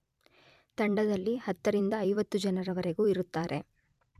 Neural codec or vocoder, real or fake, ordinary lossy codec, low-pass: vocoder, 44.1 kHz, 128 mel bands every 256 samples, BigVGAN v2; fake; none; 14.4 kHz